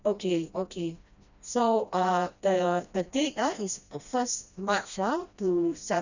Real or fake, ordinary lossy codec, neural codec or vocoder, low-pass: fake; none; codec, 16 kHz, 1 kbps, FreqCodec, smaller model; 7.2 kHz